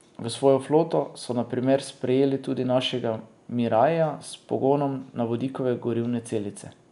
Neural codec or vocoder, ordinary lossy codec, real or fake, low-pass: none; none; real; 10.8 kHz